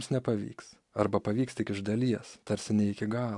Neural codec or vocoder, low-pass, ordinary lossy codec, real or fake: none; 10.8 kHz; AAC, 64 kbps; real